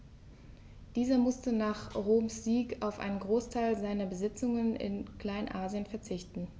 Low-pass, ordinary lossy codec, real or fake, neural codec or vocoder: none; none; real; none